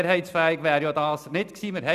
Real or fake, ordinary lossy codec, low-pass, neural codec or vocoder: real; none; 14.4 kHz; none